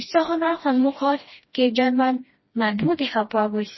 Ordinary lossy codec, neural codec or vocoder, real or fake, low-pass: MP3, 24 kbps; codec, 16 kHz, 1 kbps, FreqCodec, smaller model; fake; 7.2 kHz